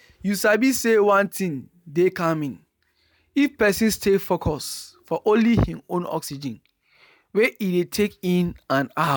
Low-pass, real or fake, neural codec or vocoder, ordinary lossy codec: none; real; none; none